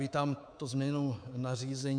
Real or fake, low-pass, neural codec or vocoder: fake; 9.9 kHz; codec, 24 kHz, 3.1 kbps, DualCodec